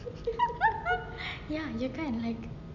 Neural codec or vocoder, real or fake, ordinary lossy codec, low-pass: none; real; none; 7.2 kHz